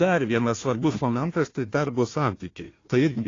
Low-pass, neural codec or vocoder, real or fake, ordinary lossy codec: 7.2 kHz; codec, 16 kHz, 1 kbps, FunCodec, trained on Chinese and English, 50 frames a second; fake; AAC, 32 kbps